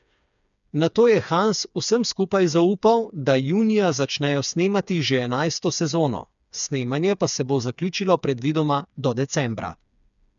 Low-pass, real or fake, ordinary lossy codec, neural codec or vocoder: 7.2 kHz; fake; none; codec, 16 kHz, 4 kbps, FreqCodec, smaller model